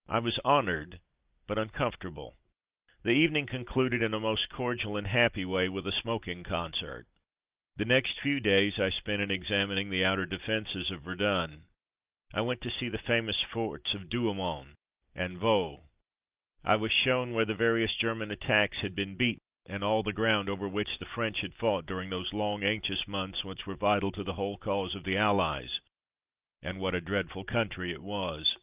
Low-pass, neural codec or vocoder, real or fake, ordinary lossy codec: 3.6 kHz; none; real; Opus, 32 kbps